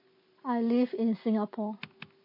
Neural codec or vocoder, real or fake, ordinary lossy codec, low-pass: none; real; MP3, 24 kbps; 5.4 kHz